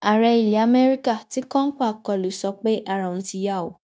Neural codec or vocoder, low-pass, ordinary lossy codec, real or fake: codec, 16 kHz, 0.9 kbps, LongCat-Audio-Codec; none; none; fake